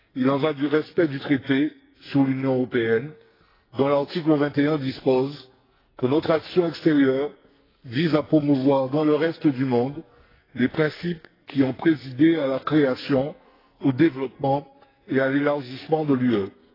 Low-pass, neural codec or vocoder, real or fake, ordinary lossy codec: 5.4 kHz; codec, 44.1 kHz, 2.6 kbps, SNAC; fake; AAC, 24 kbps